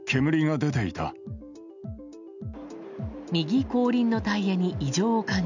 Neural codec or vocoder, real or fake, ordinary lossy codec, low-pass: none; real; none; 7.2 kHz